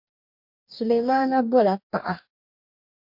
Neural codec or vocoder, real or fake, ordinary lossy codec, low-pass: codec, 44.1 kHz, 2.6 kbps, DAC; fake; MP3, 48 kbps; 5.4 kHz